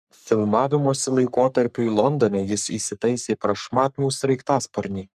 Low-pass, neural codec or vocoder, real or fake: 14.4 kHz; codec, 44.1 kHz, 3.4 kbps, Pupu-Codec; fake